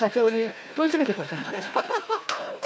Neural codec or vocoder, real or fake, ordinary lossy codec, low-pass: codec, 16 kHz, 1 kbps, FunCodec, trained on Chinese and English, 50 frames a second; fake; none; none